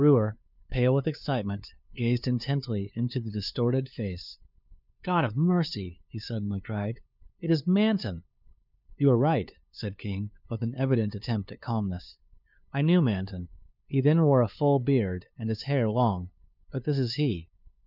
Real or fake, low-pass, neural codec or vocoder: fake; 5.4 kHz; codec, 16 kHz, 2 kbps, FunCodec, trained on LibriTTS, 25 frames a second